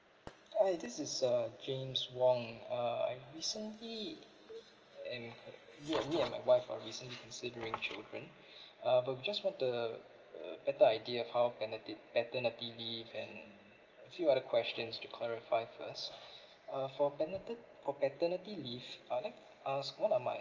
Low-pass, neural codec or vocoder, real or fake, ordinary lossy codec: 7.2 kHz; none; real; Opus, 24 kbps